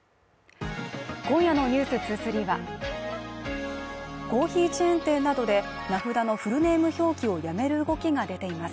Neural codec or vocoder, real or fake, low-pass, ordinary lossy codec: none; real; none; none